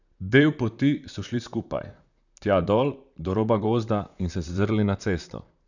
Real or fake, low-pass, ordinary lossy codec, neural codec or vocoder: fake; 7.2 kHz; none; vocoder, 44.1 kHz, 128 mel bands, Pupu-Vocoder